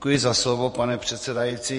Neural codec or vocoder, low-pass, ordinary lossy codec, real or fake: vocoder, 48 kHz, 128 mel bands, Vocos; 14.4 kHz; MP3, 48 kbps; fake